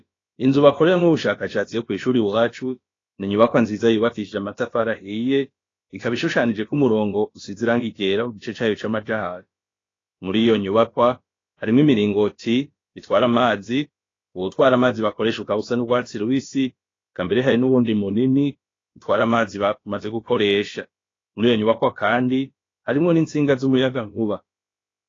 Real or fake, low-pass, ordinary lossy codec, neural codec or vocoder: fake; 7.2 kHz; AAC, 32 kbps; codec, 16 kHz, about 1 kbps, DyCAST, with the encoder's durations